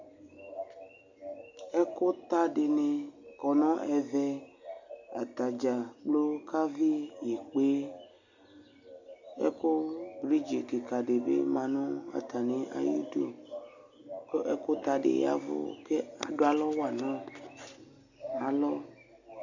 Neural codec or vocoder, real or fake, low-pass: none; real; 7.2 kHz